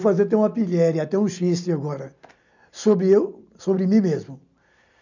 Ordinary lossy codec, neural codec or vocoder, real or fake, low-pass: none; none; real; 7.2 kHz